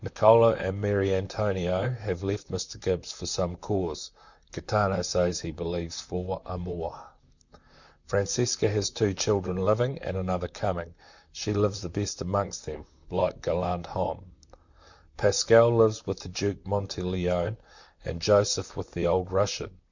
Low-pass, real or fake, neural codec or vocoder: 7.2 kHz; fake; vocoder, 44.1 kHz, 128 mel bands, Pupu-Vocoder